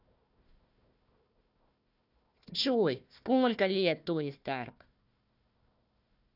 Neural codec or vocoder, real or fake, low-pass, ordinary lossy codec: codec, 16 kHz, 1 kbps, FunCodec, trained on Chinese and English, 50 frames a second; fake; 5.4 kHz; none